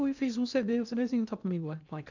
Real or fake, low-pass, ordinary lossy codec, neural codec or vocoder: fake; 7.2 kHz; none; codec, 16 kHz in and 24 kHz out, 0.8 kbps, FocalCodec, streaming, 65536 codes